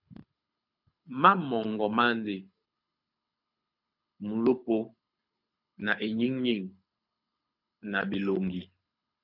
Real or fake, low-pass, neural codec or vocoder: fake; 5.4 kHz; codec, 24 kHz, 6 kbps, HILCodec